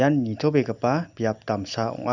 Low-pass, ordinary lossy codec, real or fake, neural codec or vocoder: 7.2 kHz; none; real; none